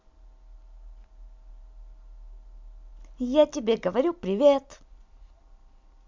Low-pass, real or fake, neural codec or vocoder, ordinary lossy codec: 7.2 kHz; real; none; MP3, 64 kbps